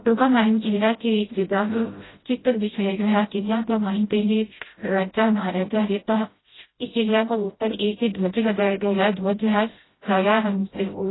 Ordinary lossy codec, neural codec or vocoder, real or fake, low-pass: AAC, 16 kbps; codec, 16 kHz, 0.5 kbps, FreqCodec, smaller model; fake; 7.2 kHz